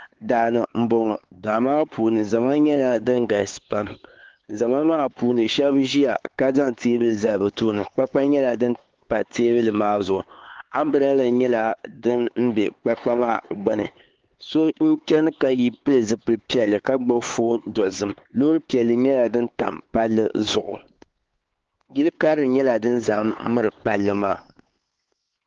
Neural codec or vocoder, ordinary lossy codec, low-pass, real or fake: codec, 16 kHz, 4 kbps, X-Codec, HuBERT features, trained on LibriSpeech; Opus, 16 kbps; 7.2 kHz; fake